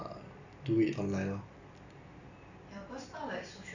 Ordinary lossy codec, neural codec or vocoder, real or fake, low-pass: none; none; real; 7.2 kHz